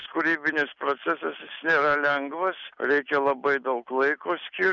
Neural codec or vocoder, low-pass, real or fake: none; 7.2 kHz; real